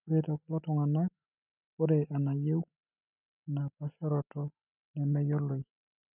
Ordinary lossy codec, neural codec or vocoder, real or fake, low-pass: none; none; real; 3.6 kHz